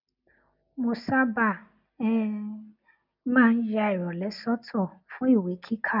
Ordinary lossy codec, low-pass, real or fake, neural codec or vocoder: none; 5.4 kHz; real; none